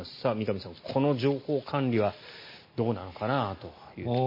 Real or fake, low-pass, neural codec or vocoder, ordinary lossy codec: real; 5.4 kHz; none; MP3, 24 kbps